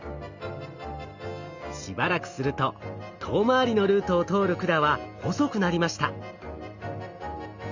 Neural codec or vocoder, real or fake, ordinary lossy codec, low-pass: none; real; Opus, 64 kbps; 7.2 kHz